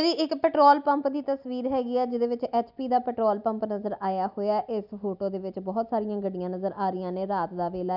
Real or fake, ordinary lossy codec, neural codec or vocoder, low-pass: real; none; none; 5.4 kHz